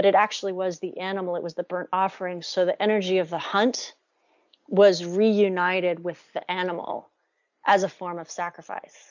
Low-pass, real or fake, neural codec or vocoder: 7.2 kHz; real; none